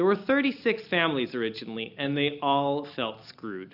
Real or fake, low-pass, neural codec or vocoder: real; 5.4 kHz; none